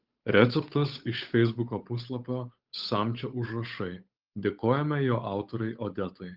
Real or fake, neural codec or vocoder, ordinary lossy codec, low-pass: fake; codec, 16 kHz, 8 kbps, FunCodec, trained on Chinese and English, 25 frames a second; Opus, 32 kbps; 5.4 kHz